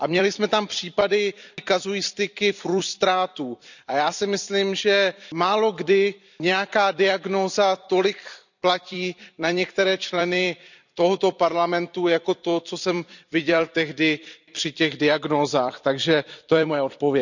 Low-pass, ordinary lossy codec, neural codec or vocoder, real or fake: 7.2 kHz; none; vocoder, 44.1 kHz, 128 mel bands every 256 samples, BigVGAN v2; fake